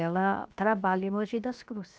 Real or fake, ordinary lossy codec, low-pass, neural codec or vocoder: fake; none; none; codec, 16 kHz, 0.7 kbps, FocalCodec